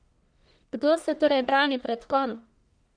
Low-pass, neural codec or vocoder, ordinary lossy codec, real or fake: 9.9 kHz; codec, 44.1 kHz, 1.7 kbps, Pupu-Codec; none; fake